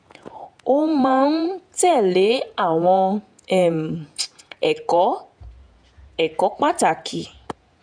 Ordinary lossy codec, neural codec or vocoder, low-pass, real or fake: none; vocoder, 48 kHz, 128 mel bands, Vocos; 9.9 kHz; fake